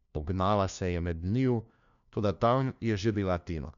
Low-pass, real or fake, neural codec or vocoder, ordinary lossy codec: 7.2 kHz; fake; codec, 16 kHz, 1 kbps, FunCodec, trained on LibriTTS, 50 frames a second; none